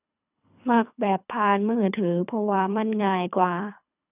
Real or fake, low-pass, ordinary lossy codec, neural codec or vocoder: fake; 3.6 kHz; none; codec, 24 kHz, 3 kbps, HILCodec